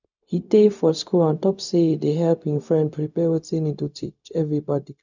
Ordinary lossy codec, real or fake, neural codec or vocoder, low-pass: none; fake; codec, 16 kHz, 0.4 kbps, LongCat-Audio-Codec; 7.2 kHz